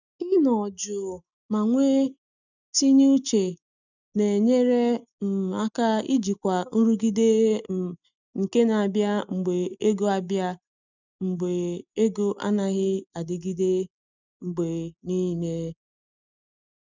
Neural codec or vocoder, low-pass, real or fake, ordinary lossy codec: none; 7.2 kHz; real; none